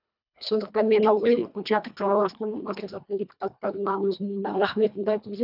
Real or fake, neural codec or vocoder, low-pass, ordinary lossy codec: fake; codec, 24 kHz, 1.5 kbps, HILCodec; 5.4 kHz; none